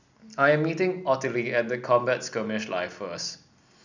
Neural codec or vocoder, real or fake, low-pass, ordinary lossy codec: none; real; 7.2 kHz; none